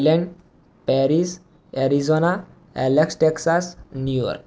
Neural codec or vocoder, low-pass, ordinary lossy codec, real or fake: none; none; none; real